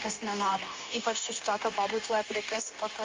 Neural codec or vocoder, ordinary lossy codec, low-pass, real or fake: codec, 16 kHz, 0.9 kbps, LongCat-Audio-Codec; Opus, 32 kbps; 7.2 kHz; fake